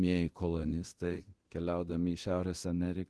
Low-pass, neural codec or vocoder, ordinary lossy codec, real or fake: 10.8 kHz; codec, 24 kHz, 0.9 kbps, DualCodec; Opus, 16 kbps; fake